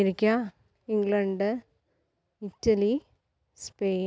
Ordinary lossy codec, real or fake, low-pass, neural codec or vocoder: none; real; none; none